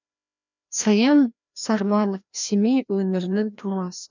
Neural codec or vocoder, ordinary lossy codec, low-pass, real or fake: codec, 16 kHz, 1 kbps, FreqCodec, larger model; none; 7.2 kHz; fake